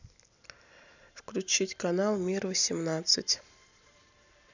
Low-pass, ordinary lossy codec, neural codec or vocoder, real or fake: 7.2 kHz; none; none; real